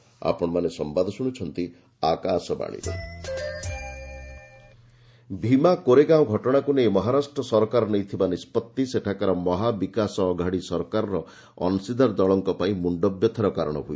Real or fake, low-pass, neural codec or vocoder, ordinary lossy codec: real; none; none; none